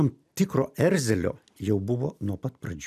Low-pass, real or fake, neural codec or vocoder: 14.4 kHz; real; none